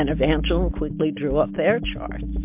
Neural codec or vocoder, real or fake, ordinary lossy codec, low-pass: none; real; MP3, 32 kbps; 3.6 kHz